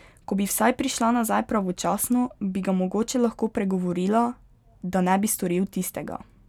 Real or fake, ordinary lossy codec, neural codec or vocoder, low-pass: real; none; none; 19.8 kHz